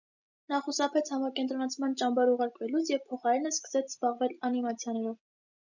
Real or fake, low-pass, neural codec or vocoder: real; 7.2 kHz; none